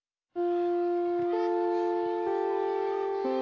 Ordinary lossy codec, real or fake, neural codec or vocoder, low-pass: AAC, 48 kbps; real; none; 7.2 kHz